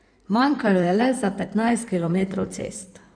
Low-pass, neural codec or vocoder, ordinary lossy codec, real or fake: 9.9 kHz; codec, 16 kHz in and 24 kHz out, 2.2 kbps, FireRedTTS-2 codec; none; fake